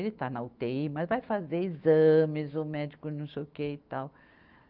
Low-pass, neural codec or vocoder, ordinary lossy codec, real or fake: 5.4 kHz; autoencoder, 48 kHz, 128 numbers a frame, DAC-VAE, trained on Japanese speech; Opus, 24 kbps; fake